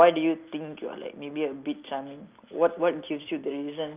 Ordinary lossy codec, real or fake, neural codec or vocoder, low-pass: Opus, 24 kbps; real; none; 3.6 kHz